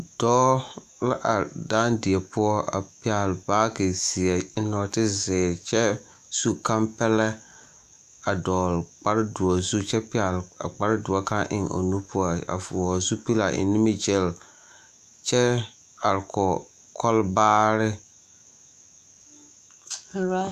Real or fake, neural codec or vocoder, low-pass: fake; autoencoder, 48 kHz, 128 numbers a frame, DAC-VAE, trained on Japanese speech; 14.4 kHz